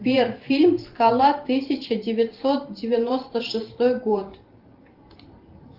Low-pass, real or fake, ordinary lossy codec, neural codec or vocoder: 5.4 kHz; fake; Opus, 32 kbps; vocoder, 44.1 kHz, 128 mel bands every 512 samples, BigVGAN v2